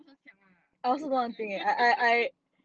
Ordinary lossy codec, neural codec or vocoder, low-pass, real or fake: Opus, 24 kbps; none; 7.2 kHz; real